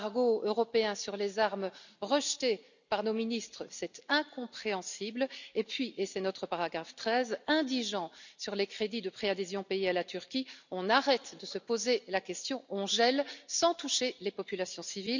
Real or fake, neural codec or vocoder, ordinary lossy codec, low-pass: real; none; none; 7.2 kHz